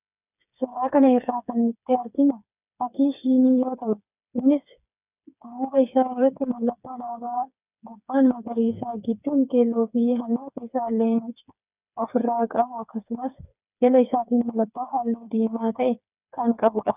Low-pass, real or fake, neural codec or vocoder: 3.6 kHz; fake; codec, 16 kHz, 4 kbps, FreqCodec, smaller model